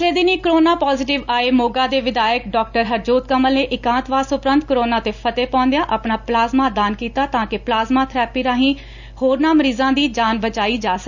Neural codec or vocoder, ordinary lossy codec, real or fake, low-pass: none; none; real; 7.2 kHz